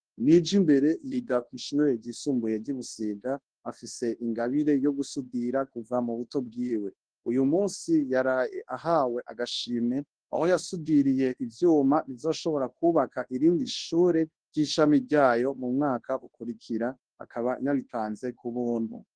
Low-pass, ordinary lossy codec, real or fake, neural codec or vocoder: 9.9 kHz; Opus, 16 kbps; fake; codec, 24 kHz, 0.9 kbps, WavTokenizer, large speech release